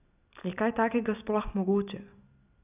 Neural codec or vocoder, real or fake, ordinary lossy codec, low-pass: none; real; none; 3.6 kHz